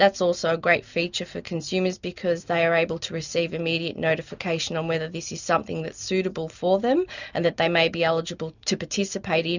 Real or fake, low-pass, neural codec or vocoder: real; 7.2 kHz; none